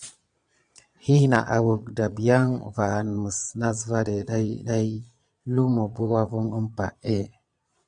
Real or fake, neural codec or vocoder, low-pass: fake; vocoder, 22.05 kHz, 80 mel bands, Vocos; 9.9 kHz